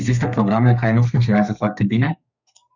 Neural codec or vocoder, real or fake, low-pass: codec, 32 kHz, 1.9 kbps, SNAC; fake; 7.2 kHz